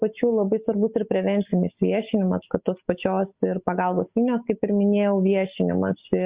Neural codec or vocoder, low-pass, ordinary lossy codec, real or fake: none; 3.6 kHz; Opus, 64 kbps; real